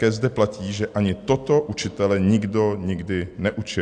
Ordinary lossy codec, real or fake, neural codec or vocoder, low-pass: AAC, 64 kbps; real; none; 9.9 kHz